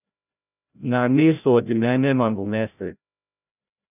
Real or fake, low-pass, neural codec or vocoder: fake; 3.6 kHz; codec, 16 kHz, 0.5 kbps, FreqCodec, larger model